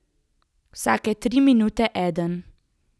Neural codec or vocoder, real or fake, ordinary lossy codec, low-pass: none; real; none; none